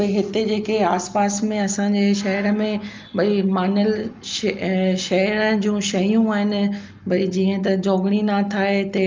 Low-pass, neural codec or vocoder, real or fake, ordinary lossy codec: 7.2 kHz; none; real; Opus, 24 kbps